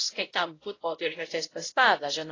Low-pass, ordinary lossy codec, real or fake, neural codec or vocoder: 7.2 kHz; AAC, 32 kbps; fake; codec, 16 kHz, 0.5 kbps, X-Codec, WavLM features, trained on Multilingual LibriSpeech